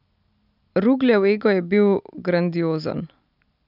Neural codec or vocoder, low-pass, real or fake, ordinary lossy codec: none; 5.4 kHz; real; none